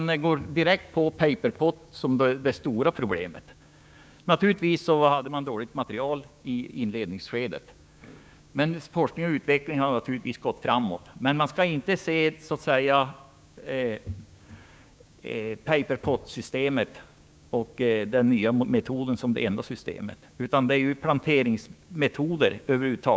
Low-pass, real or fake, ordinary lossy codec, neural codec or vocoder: none; fake; none; codec, 16 kHz, 6 kbps, DAC